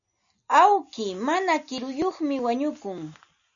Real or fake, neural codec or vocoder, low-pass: real; none; 7.2 kHz